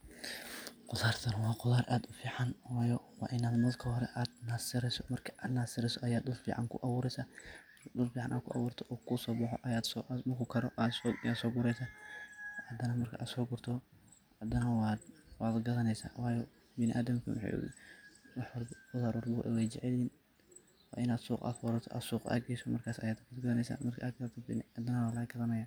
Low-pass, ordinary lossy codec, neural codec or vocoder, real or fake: none; none; none; real